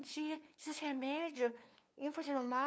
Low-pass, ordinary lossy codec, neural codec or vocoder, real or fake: none; none; codec, 16 kHz, 2 kbps, FunCodec, trained on LibriTTS, 25 frames a second; fake